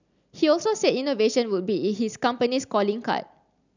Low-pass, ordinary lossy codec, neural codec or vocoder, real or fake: 7.2 kHz; none; none; real